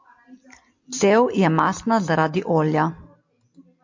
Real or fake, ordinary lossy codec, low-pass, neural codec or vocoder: real; MP3, 48 kbps; 7.2 kHz; none